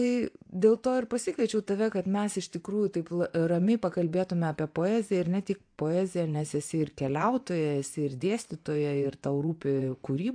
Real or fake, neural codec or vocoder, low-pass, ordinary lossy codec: fake; vocoder, 24 kHz, 100 mel bands, Vocos; 9.9 kHz; AAC, 64 kbps